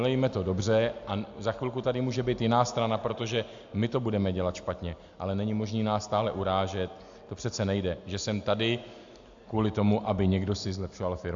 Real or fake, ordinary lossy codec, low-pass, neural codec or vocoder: real; AAC, 64 kbps; 7.2 kHz; none